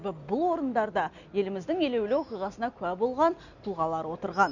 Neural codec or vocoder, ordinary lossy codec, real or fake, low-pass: none; none; real; 7.2 kHz